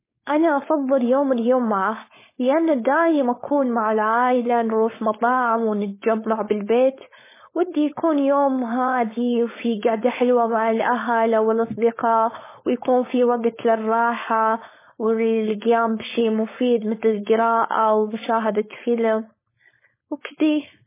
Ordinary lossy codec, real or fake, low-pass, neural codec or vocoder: MP3, 16 kbps; fake; 3.6 kHz; codec, 16 kHz, 4.8 kbps, FACodec